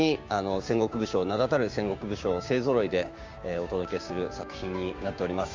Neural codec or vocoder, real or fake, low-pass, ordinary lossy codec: codec, 16 kHz, 6 kbps, DAC; fake; 7.2 kHz; Opus, 32 kbps